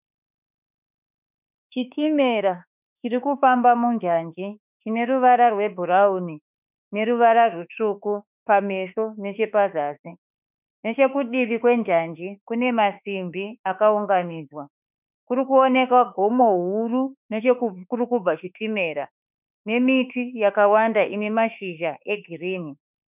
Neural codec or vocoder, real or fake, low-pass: autoencoder, 48 kHz, 32 numbers a frame, DAC-VAE, trained on Japanese speech; fake; 3.6 kHz